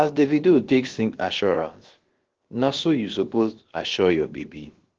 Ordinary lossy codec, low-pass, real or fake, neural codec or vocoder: Opus, 16 kbps; 7.2 kHz; fake; codec, 16 kHz, about 1 kbps, DyCAST, with the encoder's durations